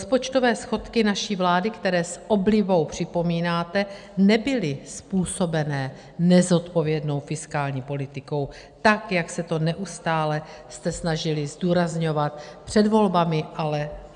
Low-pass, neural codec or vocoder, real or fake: 9.9 kHz; none; real